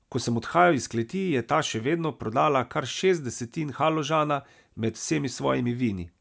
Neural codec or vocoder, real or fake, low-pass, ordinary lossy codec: none; real; none; none